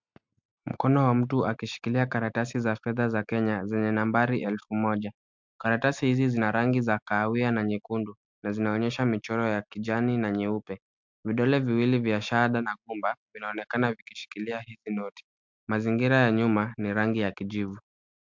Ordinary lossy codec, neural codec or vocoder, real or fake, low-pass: MP3, 64 kbps; none; real; 7.2 kHz